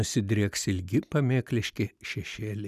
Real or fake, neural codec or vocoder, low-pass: real; none; 14.4 kHz